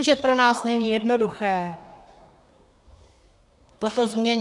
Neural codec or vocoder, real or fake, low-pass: codec, 24 kHz, 1 kbps, SNAC; fake; 10.8 kHz